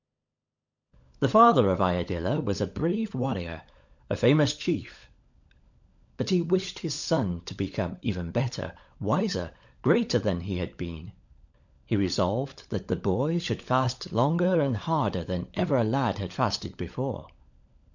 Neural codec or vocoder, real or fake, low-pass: codec, 16 kHz, 16 kbps, FunCodec, trained on LibriTTS, 50 frames a second; fake; 7.2 kHz